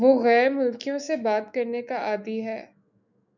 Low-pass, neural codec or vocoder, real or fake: 7.2 kHz; autoencoder, 48 kHz, 128 numbers a frame, DAC-VAE, trained on Japanese speech; fake